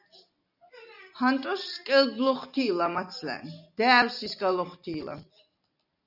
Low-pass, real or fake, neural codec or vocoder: 5.4 kHz; real; none